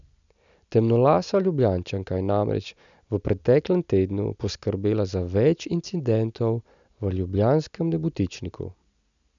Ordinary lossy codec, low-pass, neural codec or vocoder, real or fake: none; 7.2 kHz; none; real